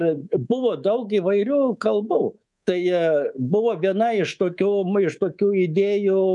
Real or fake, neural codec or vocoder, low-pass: fake; codec, 24 kHz, 3.1 kbps, DualCodec; 10.8 kHz